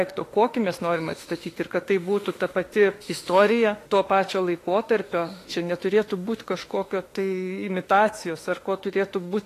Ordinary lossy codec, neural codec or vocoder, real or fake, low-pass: AAC, 48 kbps; autoencoder, 48 kHz, 32 numbers a frame, DAC-VAE, trained on Japanese speech; fake; 14.4 kHz